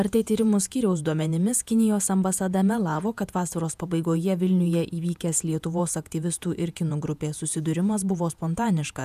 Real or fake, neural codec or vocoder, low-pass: fake; vocoder, 48 kHz, 128 mel bands, Vocos; 14.4 kHz